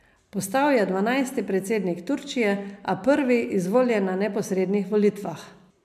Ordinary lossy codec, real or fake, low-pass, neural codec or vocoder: MP3, 96 kbps; real; 14.4 kHz; none